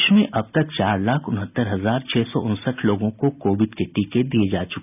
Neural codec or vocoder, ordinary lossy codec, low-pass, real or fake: none; none; 3.6 kHz; real